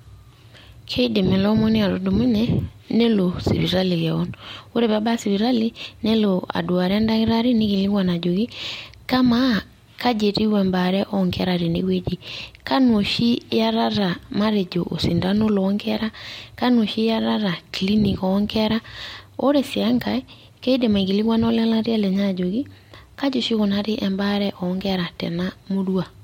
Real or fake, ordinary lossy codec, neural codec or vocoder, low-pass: real; MP3, 64 kbps; none; 19.8 kHz